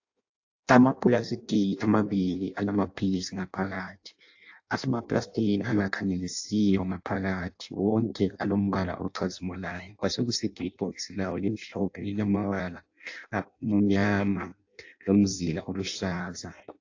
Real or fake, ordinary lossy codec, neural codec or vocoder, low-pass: fake; AAC, 48 kbps; codec, 16 kHz in and 24 kHz out, 0.6 kbps, FireRedTTS-2 codec; 7.2 kHz